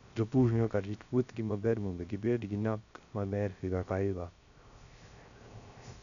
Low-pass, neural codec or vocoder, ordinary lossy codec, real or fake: 7.2 kHz; codec, 16 kHz, 0.3 kbps, FocalCodec; none; fake